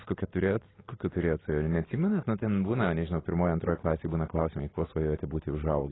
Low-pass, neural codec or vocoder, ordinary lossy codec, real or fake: 7.2 kHz; none; AAC, 16 kbps; real